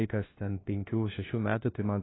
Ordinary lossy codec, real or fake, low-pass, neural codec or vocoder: AAC, 16 kbps; fake; 7.2 kHz; codec, 16 kHz, 1 kbps, FunCodec, trained on LibriTTS, 50 frames a second